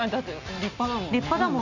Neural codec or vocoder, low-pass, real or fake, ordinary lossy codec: none; 7.2 kHz; real; none